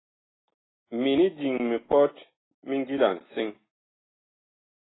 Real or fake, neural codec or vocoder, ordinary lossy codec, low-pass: real; none; AAC, 16 kbps; 7.2 kHz